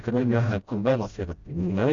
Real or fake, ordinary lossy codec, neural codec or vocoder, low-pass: fake; Opus, 64 kbps; codec, 16 kHz, 0.5 kbps, FreqCodec, smaller model; 7.2 kHz